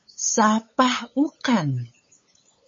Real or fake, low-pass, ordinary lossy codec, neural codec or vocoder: fake; 7.2 kHz; MP3, 32 kbps; codec, 16 kHz, 8 kbps, FunCodec, trained on LibriTTS, 25 frames a second